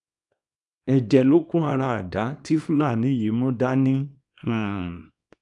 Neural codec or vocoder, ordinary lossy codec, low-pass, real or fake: codec, 24 kHz, 0.9 kbps, WavTokenizer, small release; none; 10.8 kHz; fake